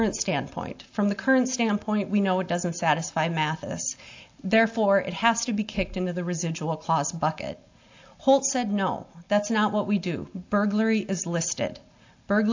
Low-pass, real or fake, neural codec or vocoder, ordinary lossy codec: 7.2 kHz; real; none; AAC, 48 kbps